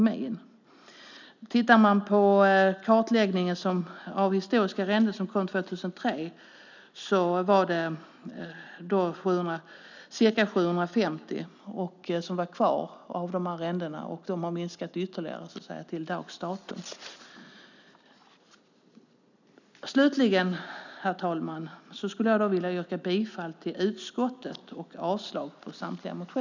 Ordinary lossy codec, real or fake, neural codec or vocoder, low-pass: none; real; none; 7.2 kHz